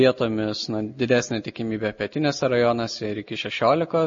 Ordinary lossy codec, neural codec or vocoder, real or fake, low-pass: MP3, 32 kbps; none; real; 7.2 kHz